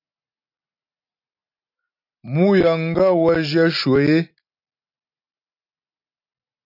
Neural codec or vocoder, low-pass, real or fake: none; 5.4 kHz; real